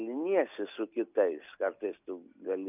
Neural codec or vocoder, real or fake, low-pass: none; real; 3.6 kHz